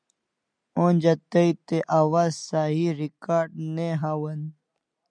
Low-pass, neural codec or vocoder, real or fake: 9.9 kHz; none; real